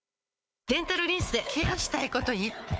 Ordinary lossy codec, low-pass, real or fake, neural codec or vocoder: none; none; fake; codec, 16 kHz, 4 kbps, FunCodec, trained on Chinese and English, 50 frames a second